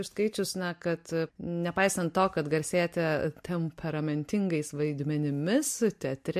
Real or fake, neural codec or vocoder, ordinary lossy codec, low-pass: real; none; MP3, 64 kbps; 14.4 kHz